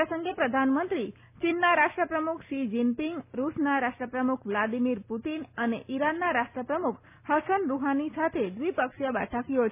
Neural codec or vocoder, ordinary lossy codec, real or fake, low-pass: none; none; real; 3.6 kHz